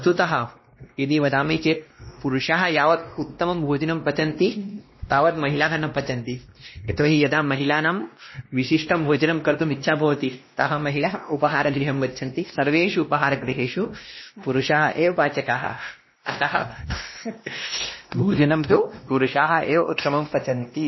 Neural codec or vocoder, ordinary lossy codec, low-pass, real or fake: codec, 16 kHz, 1 kbps, X-Codec, WavLM features, trained on Multilingual LibriSpeech; MP3, 24 kbps; 7.2 kHz; fake